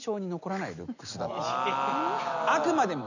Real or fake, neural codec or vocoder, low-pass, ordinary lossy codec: real; none; 7.2 kHz; none